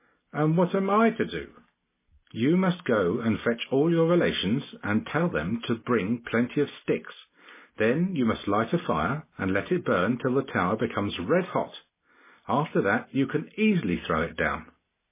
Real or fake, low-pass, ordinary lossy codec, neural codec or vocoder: real; 3.6 kHz; MP3, 16 kbps; none